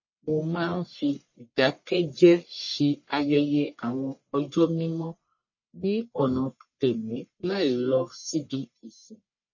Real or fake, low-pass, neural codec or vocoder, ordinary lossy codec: fake; 7.2 kHz; codec, 44.1 kHz, 1.7 kbps, Pupu-Codec; MP3, 32 kbps